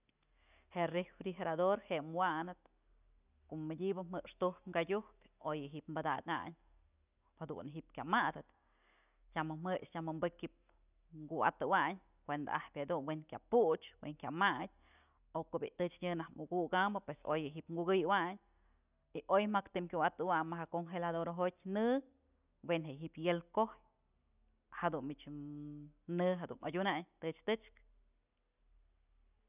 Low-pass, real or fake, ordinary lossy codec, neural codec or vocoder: 3.6 kHz; real; none; none